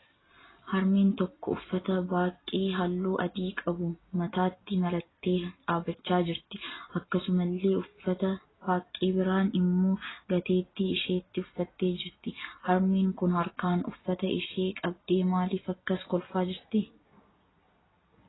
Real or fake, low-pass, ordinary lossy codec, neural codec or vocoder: real; 7.2 kHz; AAC, 16 kbps; none